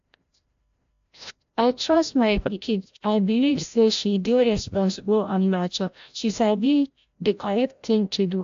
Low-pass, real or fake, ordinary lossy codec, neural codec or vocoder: 7.2 kHz; fake; AAC, 64 kbps; codec, 16 kHz, 0.5 kbps, FreqCodec, larger model